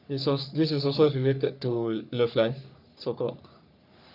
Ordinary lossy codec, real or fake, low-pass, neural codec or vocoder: none; fake; 5.4 kHz; codec, 44.1 kHz, 3.4 kbps, Pupu-Codec